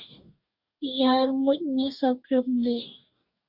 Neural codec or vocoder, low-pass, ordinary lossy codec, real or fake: codec, 44.1 kHz, 2.6 kbps, DAC; 5.4 kHz; Opus, 64 kbps; fake